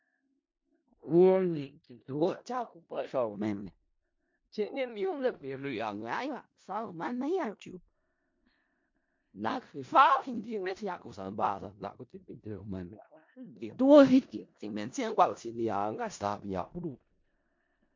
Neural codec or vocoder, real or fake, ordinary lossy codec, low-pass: codec, 16 kHz in and 24 kHz out, 0.4 kbps, LongCat-Audio-Codec, four codebook decoder; fake; MP3, 48 kbps; 7.2 kHz